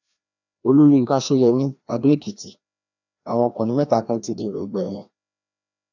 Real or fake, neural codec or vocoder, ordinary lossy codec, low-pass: fake; codec, 16 kHz, 1 kbps, FreqCodec, larger model; none; 7.2 kHz